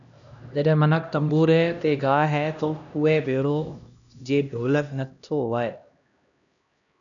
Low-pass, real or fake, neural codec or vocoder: 7.2 kHz; fake; codec, 16 kHz, 1 kbps, X-Codec, HuBERT features, trained on LibriSpeech